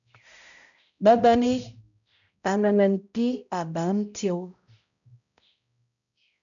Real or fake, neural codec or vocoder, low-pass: fake; codec, 16 kHz, 0.5 kbps, X-Codec, HuBERT features, trained on balanced general audio; 7.2 kHz